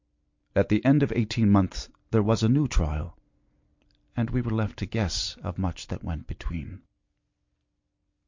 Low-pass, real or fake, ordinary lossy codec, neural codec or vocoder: 7.2 kHz; real; MP3, 48 kbps; none